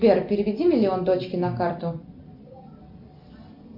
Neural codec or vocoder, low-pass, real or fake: none; 5.4 kHz; real